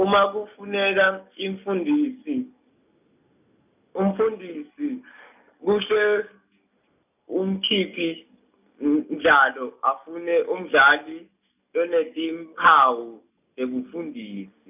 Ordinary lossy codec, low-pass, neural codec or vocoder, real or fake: none; 3.6 kHz; none; real